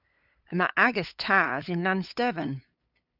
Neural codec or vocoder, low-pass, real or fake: codec, 16 kHz, 8 kbps, FunCodec, trained on LibriTTS, 25 frames a second; 5.4 kHz; fake